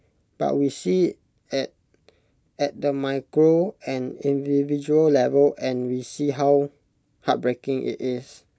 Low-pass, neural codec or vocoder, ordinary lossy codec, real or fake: none; none; none; real